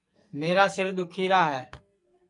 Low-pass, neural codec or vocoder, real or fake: 10.8 kHz; codec, 44.1 kHz, 2.6 kbps, SNAC; fake